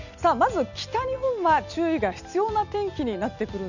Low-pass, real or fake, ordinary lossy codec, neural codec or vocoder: 7.2 kHz; real; none; none